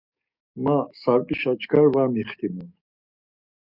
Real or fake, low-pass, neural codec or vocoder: fake; 5.4 kHz; codec, 44.1 kHz, 7.8 kbps, DAC